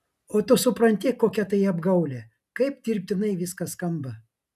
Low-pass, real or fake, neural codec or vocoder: 14.4 kHz; real; none